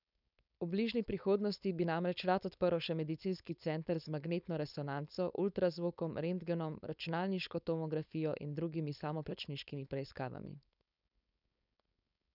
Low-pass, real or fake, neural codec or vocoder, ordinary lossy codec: 5.4 kHz; fake; codec, 16 kHz, 4.8 kbps, FACodec; none